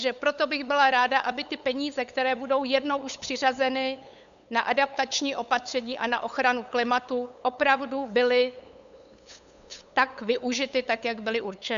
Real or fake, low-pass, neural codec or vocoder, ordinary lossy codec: fake; 7.2 kHz; codec, 16 kHz, 8 kbps, FunCodec, trained on LibriTTS, 25 frames a second; AAC, 96 kbps